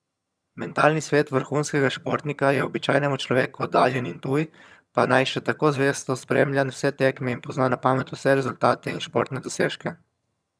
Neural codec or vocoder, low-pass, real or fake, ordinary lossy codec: vocoder, 22.05 kHz, 80 mel bands, HiFi-GAN; none; fake; none